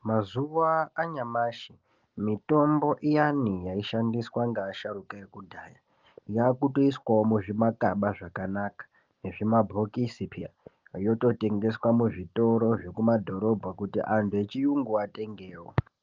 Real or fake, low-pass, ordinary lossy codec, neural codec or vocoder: real; 7.2 kHz; Opus, 32 kbps; none